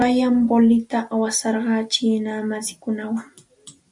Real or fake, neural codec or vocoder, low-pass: real; none; 10.8 kHz